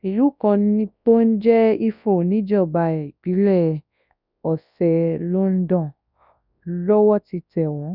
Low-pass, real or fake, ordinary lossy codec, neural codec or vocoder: 5.4 kHz; fake; none; codec, 24 kHz, 0.9 kbps, WavTokenizer, large speech release